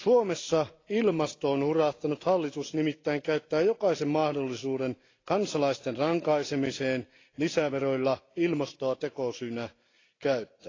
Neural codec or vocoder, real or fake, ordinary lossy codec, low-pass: none; real; AAC, 32 kbps; 7.2 kHz